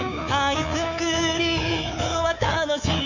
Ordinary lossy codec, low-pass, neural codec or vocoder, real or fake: none; 7.2 kHz; codec, 24 kHz, 3.1 kbps, DualCodec; fake